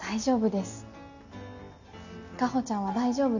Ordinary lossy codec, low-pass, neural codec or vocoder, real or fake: none; 7.2 kHz; none; real